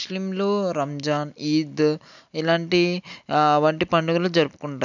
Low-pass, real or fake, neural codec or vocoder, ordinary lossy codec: 7.2 kHz; real; none; none